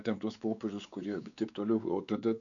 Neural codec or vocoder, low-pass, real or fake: codec, 16 kHz, 4 kbps, X-Codec, WavLM features, trained on Multilingual LibriSpeech; 7.2 kHz; fake